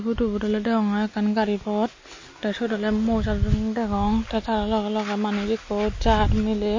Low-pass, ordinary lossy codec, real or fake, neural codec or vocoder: 7.2 kHz; MP3, 32 kbps; real; none